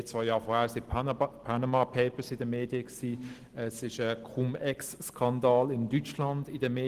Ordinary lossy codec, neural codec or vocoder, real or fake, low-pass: Opus, 16 kbps; none; real; 14.4 kHz